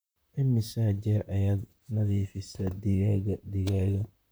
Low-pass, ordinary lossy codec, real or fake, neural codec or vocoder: none; none; fake; vocoder, 44.1 kHz, 128 mel bands, Pupu-Vocoder